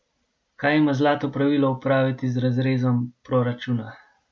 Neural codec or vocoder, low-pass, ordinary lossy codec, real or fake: none; none; none; real